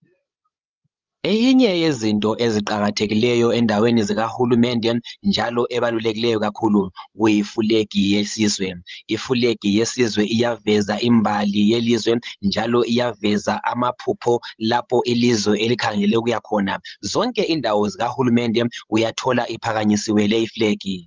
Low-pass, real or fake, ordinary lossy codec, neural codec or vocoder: 7.2 kHz; fake; Opus, 32 kbps; codec, 16 kHz, 16 kbps, FreqCodec, larger model